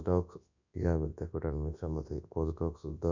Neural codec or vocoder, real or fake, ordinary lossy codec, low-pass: codec, 24 kHz, 0.9 kbps, WavTokenizer, large speech release; fake; none; 7.2 kHz